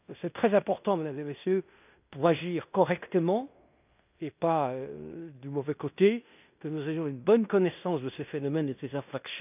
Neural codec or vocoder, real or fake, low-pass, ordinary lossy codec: codec, 16 kHz in and 24 kHz out, 0.9 kbps, LongCat-Audio-Codec, fine tuned four codebook decoder; fake; 3.6 kHz; none